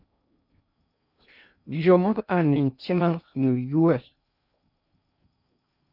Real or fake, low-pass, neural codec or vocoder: fake; 5.4 kHz; codec, 16 kHz in and 24 kHz out, 0.6 kbps, FocalCodec, streaming, 2048 codes